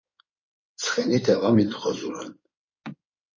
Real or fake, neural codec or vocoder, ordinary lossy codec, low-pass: fake; codec, 16 kHz in and 24 kHz out, 2.2 kbps, FireRedTTS-2 codec; MP3, 32 kbps; 7.2 kHz